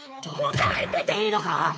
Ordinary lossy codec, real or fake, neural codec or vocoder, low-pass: none; fake; codec, 16 kHz, 4 kbps, X-Codec, WavLM features, trained on Multilingual LibriSpeech; none